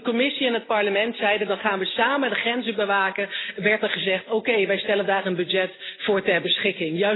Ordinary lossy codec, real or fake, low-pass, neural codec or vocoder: AAC, 16 kbps; real; 7.2 kHz; none